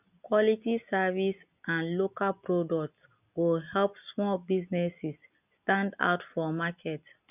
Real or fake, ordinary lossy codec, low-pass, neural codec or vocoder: real; none; 3.6 kHz; none